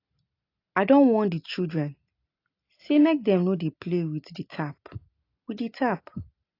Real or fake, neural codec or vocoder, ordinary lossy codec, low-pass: real; none; AAC, 32 kbps; 5.4 kHz